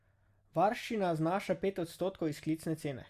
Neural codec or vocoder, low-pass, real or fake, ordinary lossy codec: none; 14.4 kHz; real; Opus, 64 kbps